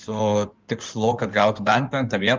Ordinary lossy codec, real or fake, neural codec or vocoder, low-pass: Opus, 32 kbps; fake; codec, 16 kHz in and 24 kHz out, 2.2 kbps, FireRedTTS-2 codec; 7.2 kHz